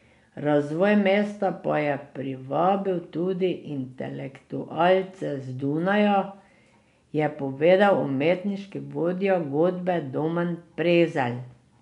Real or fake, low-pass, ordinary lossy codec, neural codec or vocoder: real; 10.8 kHz; MP3, 96 kbps; none